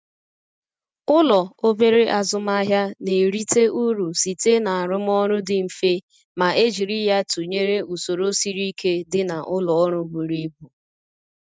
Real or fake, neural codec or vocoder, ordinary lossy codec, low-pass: real; none; none; none